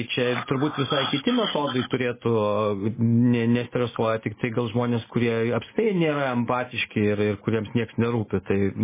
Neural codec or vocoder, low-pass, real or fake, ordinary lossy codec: none; 3.6 kHz; real; MP3, 16 kbps